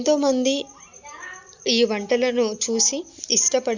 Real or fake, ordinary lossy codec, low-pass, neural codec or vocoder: real; Opus, 64 kbps; 7.2 kHz; none